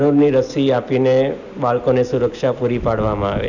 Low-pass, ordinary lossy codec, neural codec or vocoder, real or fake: 7.2 kHz; none; none; real